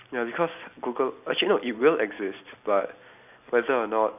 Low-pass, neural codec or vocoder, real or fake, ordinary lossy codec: 3.6 kHz; none; real; none